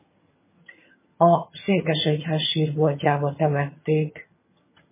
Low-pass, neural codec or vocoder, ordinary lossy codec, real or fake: 3.6 kHz; vocoder, 22.05 kHz, 80 mel bands, HiFi-GAN; MP3, 16 kbps; fake